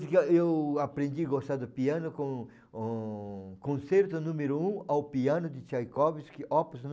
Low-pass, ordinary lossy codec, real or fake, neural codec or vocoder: none; none; real; none